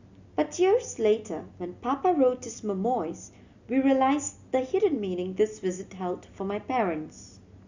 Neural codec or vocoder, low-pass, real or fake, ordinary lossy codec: none; 7.2 kHz; real; Opus, 64 kbps